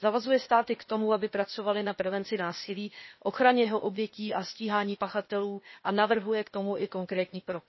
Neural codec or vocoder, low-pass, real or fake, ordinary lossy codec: codec, 16 kHz, 0.8 kbps, ZipCodec; 7.2 kHz; fake; MP3, 24 kbps